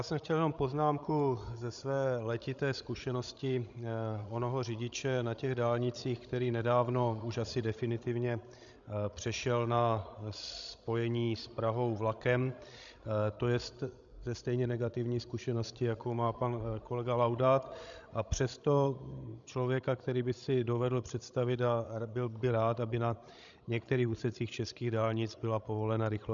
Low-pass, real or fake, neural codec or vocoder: 7.2 kHz; fake; codec, 16 kHz, 16 kbps, FreqCodec, larger model